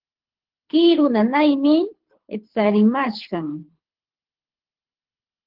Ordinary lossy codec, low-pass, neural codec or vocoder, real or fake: Opus, 16 kbps; 5.4 kHz; codec, 24 kHz, 6 kbps, HILCodec; fake